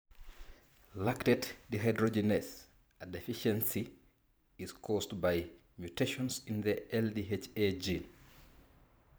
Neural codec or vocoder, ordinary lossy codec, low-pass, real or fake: vocoder, 44.1 kHz, 128 mel bands every 512 samples, BigVGAN v2; none; none; fake